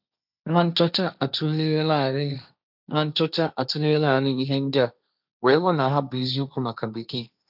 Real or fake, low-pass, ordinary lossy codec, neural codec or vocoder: fake; 5.4 kHz; none; codec, 16 kHz, 1.1 kbps, Voila-Tokenizer